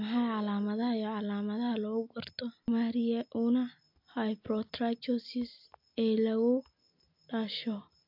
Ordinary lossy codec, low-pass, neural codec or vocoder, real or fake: AAC, 48 kbps; 5.4 kHz; none; real